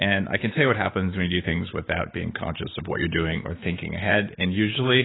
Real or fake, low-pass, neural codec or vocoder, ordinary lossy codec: fake; 7.2 kHz; codec, 16 kHz, 6 kbps, DAC; AAC, 16 kbps